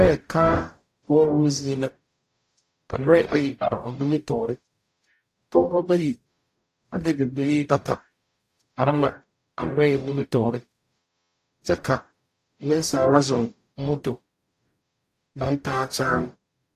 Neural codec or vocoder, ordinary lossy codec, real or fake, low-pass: codec, 44.1 kHz, 0.9 kbps, DAC; AAC, 64 kbps; fake; 14.4 kHz